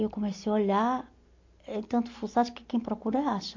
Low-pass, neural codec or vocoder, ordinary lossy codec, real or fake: 7.2 kHz; none; MP3, 48 kbps; real